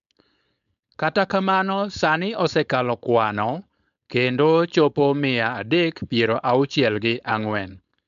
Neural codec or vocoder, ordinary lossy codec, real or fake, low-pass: codec, 16 kHz, 4.8 kbps, FACodec; AAC, 96 kbps; fake; 7.2 kHz